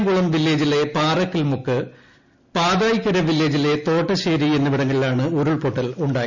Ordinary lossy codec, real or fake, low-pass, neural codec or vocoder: none; real; 7.2 kHz; none